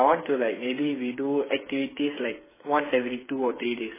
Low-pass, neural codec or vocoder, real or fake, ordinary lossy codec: 3.6 kHz; codec, 16 kHz, 8 kbps, FreqCodec, smaller model; fake; MP3, 16 kbps